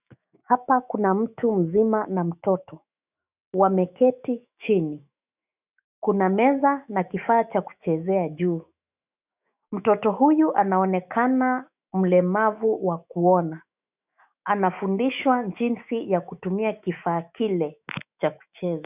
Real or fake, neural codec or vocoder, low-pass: fake; autoencoder, 48 kHz, 128 numbers a frame, DAC-VAE, trained on Japanese speech; 3.6 kHz